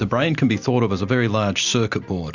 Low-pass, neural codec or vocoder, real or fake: 7.2 kHz; none; real